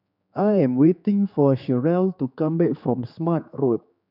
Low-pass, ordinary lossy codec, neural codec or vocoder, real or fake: 5.4 kHz; none; codec, 16 kHz, 4 kbps, X-Codec, HuBERT features, trained on general audio; fake